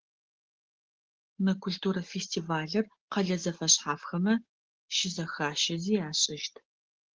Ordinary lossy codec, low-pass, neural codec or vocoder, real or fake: Opus, 16 kbps; 7.2 kHz; none; real